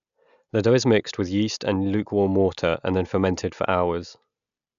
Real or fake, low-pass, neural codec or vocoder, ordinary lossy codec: real; 7.2 kHz; none; MP3, 96 kbps